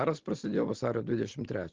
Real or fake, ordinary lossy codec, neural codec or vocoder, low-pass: real; Opus, 16 kbps; none; 7.2 kHz